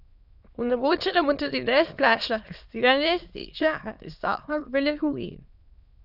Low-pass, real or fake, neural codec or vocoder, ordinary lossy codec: 5.4 kHz; fake; autoencoder, 22.05 kHz, a latent of 192 numbers a frame, VITS, trained on many speakers; none